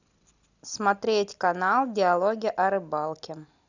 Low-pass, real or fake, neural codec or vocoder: 7.2 kHz; real; none